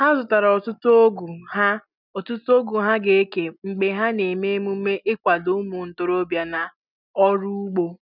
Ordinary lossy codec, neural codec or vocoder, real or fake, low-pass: none; none; real; 5.4 kHz